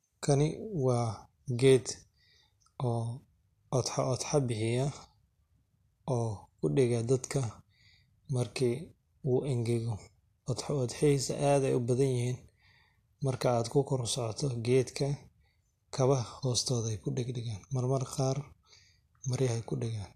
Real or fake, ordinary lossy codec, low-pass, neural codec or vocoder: real; AAC, 64 kbps; 14.4 kHz; none